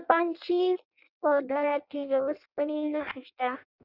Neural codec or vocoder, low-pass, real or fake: codec, 16 kHz in and 24 kHz out, 0.6 kbps, FireRedTTS-2 codec; 5.4 kHz; fake